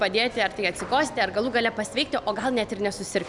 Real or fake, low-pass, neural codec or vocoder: real; 10.8 kHz; none